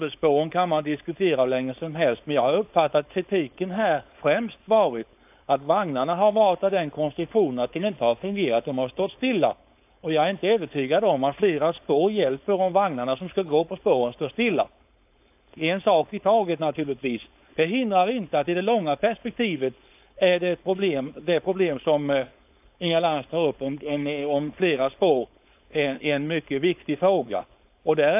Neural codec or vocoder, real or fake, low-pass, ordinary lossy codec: codec, 16 kHz, 4.8 kbps, FACodec; fake; 3.6 kHz; AAC, 32 kbps